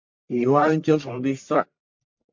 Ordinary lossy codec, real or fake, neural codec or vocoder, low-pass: MP3, 48 kbps; fake; codec, 44.1 kHz, 1.7 kbps, Pupu-Codec; 7.2 kHz